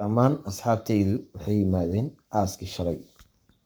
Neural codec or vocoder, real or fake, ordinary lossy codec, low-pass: codec, 44.1 kHz, 7.8 kbps, Pupu-Codec; fake; none; none